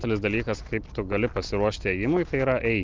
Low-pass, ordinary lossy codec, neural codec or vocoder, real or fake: 7.2 kHz; Opus, 32 kbps; none; real